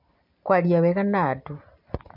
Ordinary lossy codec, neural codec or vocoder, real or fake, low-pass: MP3, 48 kbps; none; real; 5.4 kHz